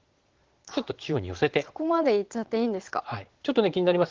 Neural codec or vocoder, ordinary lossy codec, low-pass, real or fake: vocoder, 22.05 kHz, 80 mel bands, Vocos; Opus, 16 kbps; 7.2 kHz; fake